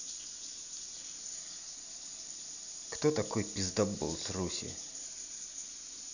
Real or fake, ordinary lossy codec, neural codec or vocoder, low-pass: real; none; none; 7.2 kHz